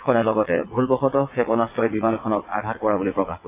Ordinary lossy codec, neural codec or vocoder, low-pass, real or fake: AAC, 24 kbps; vocoder, 22.05 kHz, 80 mel bands, WaveNeXt; 3.6 kHz; fake